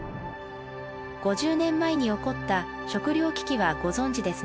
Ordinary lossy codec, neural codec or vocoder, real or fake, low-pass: none; none; real; none